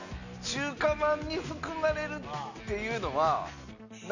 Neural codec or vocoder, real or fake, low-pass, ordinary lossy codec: none; real; 7.2 kHz; none